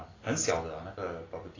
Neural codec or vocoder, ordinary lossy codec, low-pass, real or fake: none; AAC, 32 kbps; 7.2 kHz; real